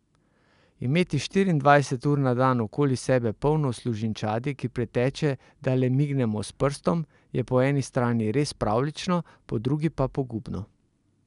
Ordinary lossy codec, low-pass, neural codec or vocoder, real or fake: none; 10.8 kHz; none; real